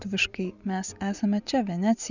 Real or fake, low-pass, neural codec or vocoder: fake; 7.2 kHz; vocoder, 24 kHz, 100 mel bands, Vocos